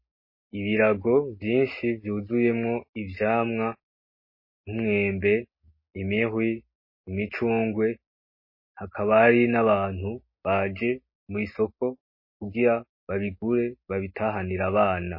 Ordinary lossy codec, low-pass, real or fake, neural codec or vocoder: MP3, 24 kbps; 5.4 kHz; real; none